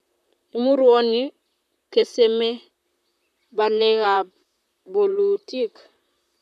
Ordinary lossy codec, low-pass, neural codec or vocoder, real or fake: none; 14.4 kHz; vocoder, 44.1 kHz, 128 mel bands, Pupu-Vocoder; fake